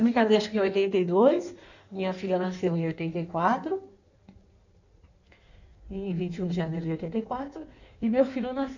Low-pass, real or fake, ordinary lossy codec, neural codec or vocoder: 7.2 kHz; fake; none; codec, 16 kHz in and 24 kHz out, 1.1 kbps, FireRedTTS-2 codec